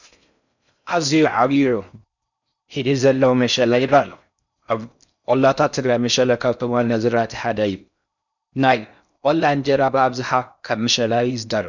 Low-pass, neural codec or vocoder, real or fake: 7.2 kHz; codec, 16 kHz in and 24 kHz out, 0.6 kbps, FocalCodec, streaming, 4096 codes; fake